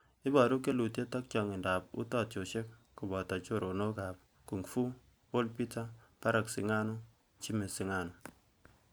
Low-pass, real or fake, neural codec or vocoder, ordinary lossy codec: none; real; none; none